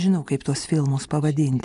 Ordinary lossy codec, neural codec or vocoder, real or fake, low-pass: MP3, 96 kbps; none; real; 10.8 kHz